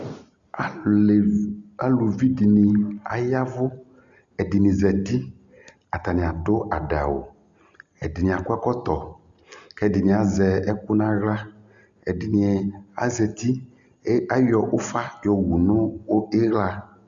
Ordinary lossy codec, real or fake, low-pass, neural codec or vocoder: Opus, 64 kbps; real; 7.2 kHz; none